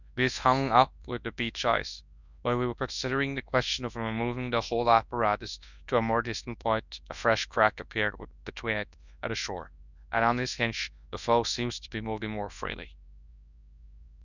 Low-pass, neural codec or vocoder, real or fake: 7.2 kHz; codec, 24 kHz, 0.9 kbps, WavTokenizer, large speech release; fake